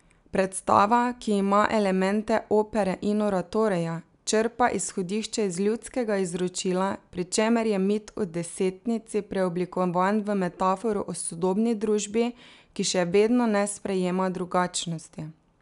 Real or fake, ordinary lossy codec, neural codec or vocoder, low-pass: real; none; none; 10.8 kHz